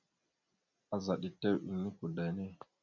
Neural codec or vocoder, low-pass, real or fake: none; 7.2 kHz; real